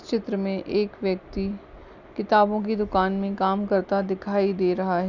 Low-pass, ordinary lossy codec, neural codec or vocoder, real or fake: 7.2 kHz; none; none; real